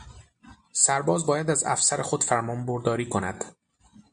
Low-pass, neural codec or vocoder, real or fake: 9.9 kHz; none; real